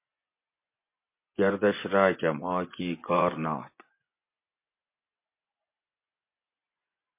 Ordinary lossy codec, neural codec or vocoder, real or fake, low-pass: MP3, 24 kbps; vocoder, 24 kHz, 100 mel bands, Vocos; fake; 3.6 kHz